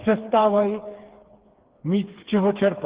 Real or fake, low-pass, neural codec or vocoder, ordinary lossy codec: fake; 3.6 kHz; codec, 16 kHz, 4 kbps, FreqCodec, smaller model; Opus, 16 kbps